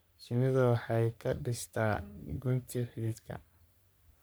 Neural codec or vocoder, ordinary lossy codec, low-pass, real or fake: codec, 44.1 kHz, 3.4 kbps, Pupu-Codec; none; none; fake